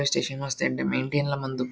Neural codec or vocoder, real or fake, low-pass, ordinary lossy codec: none; real; none; none